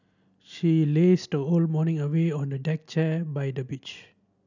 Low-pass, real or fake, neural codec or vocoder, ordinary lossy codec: 7.2 kHz; real; none; none